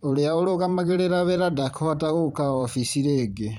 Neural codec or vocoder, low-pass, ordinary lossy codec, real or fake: vocoder, 44.1 kHz, 128 mel bands every 512 samples, BigVGAN v2; 19.8 kHz; none; fake